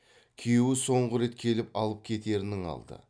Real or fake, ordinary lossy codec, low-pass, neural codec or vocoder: real; none; 9.9 kHz; none